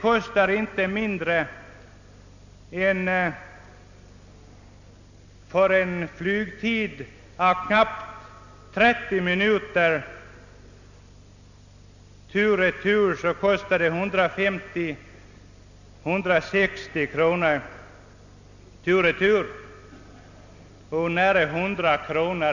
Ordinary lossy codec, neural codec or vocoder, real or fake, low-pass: none; none; real; 7.2 kHz